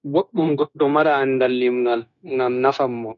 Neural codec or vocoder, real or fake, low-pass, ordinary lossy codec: codec, 16 kHz, 0.9 kbps, LongCat-Audio-Codec; fake; 7.2 kHz; none